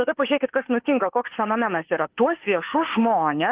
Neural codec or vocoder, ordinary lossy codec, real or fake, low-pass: autoencoder, 48 kHz, 32 numbers a frame, DAC-VAE, trained on Japanese speech; Opus, 16 kbps; fake; 3.6 kHz